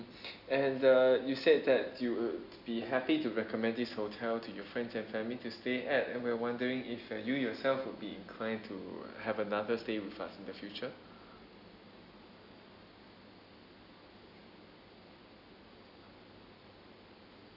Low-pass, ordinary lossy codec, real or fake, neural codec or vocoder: 5.4 kHz; none; real; none